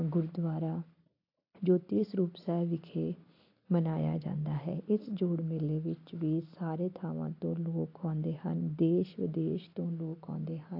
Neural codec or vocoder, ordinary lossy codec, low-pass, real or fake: none; AAC, 32 kbps; 5.4 kHz; real